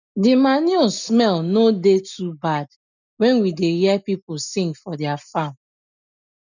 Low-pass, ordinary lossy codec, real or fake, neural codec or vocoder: 7.2 kHz; none; real; none